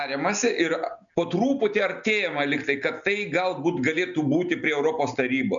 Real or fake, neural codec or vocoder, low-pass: real; none; 7.2 kHz